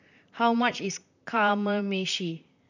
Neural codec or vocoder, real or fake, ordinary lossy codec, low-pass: vocoder, 44.1 kHz, 128 mel bands, Pupu-Vocoder; fake; none; 7.2 kHz